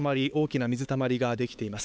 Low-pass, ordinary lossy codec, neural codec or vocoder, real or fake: none; none; codec, 16 kHz, 4 kbps, X-Codec, HuBERT features, trained on LibriSpeech; fake